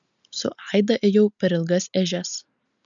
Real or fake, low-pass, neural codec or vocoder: real; 7.2 kHz; none